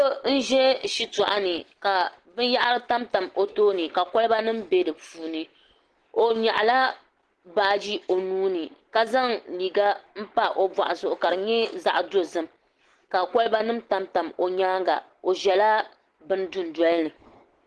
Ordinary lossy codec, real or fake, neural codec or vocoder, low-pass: Opus, 16 kbps; real; none; 10.8 kHz